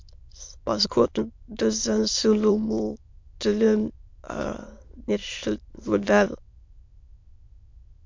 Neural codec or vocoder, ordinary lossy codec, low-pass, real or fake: autoencoder, 22.05 kHz, a latent of 192 numbers a frame, VITS, trained on many speakers; MP3, 48 kbps; 7.2 kHz; fake